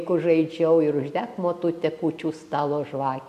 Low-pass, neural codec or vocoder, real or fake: 14.4 kHz; none; real